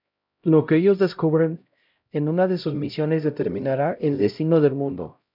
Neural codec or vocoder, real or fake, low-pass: codec, 16 kHz, 0.5 kbps, X-Codec, HuBERT features, trained on LibriSpeech; fake; 5.4 kHz